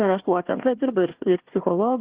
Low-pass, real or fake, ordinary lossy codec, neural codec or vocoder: 3.6 kHz; fake; Opus, 16 kbps; codec, 16 kHz, 1 kbps, FunCodec, trained on Chinese and English, 50 frames a second